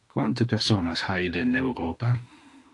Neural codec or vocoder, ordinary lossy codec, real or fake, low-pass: autoencoder, 48 kHz, 32 numbers a frame, DAC-VAE, trained on Japanese speech; AAC, 48 kbps; fake; 10.8 kHz